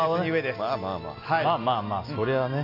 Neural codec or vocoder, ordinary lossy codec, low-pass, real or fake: none; AAC, 32 kbps; 5.4 kHz; real